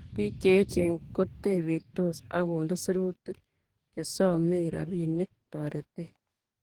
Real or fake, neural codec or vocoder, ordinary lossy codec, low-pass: fake; codec, 44.1 kHz, 2.6 kbps, DAC; Opus, 24 kbps; 19.8 kHz